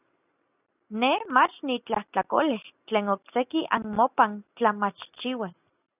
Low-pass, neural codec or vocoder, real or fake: 3.6 kHz; none; real